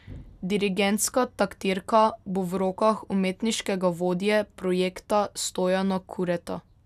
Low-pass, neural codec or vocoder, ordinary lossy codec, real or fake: 14.4 kHz; none; none; real